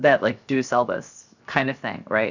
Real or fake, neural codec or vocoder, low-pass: fake; codec, 16 kHz, 0.7 kbps, FocalCodec; 7.2 kHz